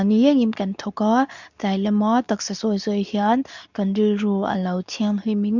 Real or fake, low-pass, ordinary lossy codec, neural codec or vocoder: fake; 7.2 kHz; none; codec, 24 kHz, 0.9 kbps, WavTokenizer, medium speech release version 2